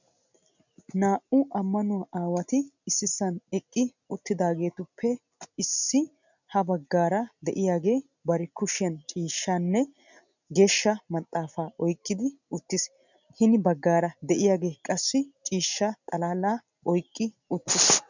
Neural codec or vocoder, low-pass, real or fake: none; 7.2 kHz; real